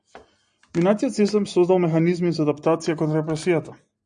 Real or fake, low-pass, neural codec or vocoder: real; 9.9 kHz; none